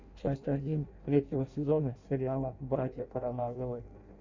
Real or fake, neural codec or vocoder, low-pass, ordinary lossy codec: fake; codec, 16 kHz in and 24 kHz out, 0.6 kbps, FireRedTTS-2 codec; 7.2 kHz; Opus, 64 kbps